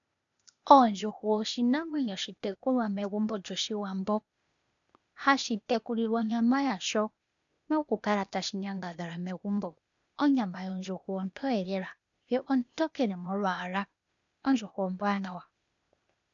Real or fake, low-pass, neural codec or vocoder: fake; 7.2 kHz; codec, 16 kHz, 0.8 kbps, ZipCodec